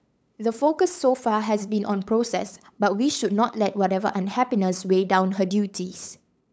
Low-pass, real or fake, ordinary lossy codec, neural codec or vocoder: none; fake; none; codec, 16 kHz, 8 kbps, FunCodec, trained on LibriTTS, 25 frames a second